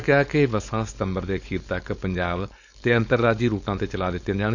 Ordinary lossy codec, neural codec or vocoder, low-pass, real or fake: none; codec, 16 kHz, 4.8 kbps, FACodec; 7.2 kHz; fake